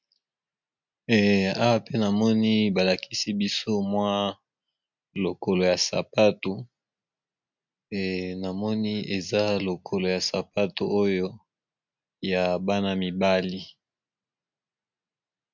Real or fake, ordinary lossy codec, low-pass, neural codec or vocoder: real; MP3, 64 kbps; 7.2 kHz; none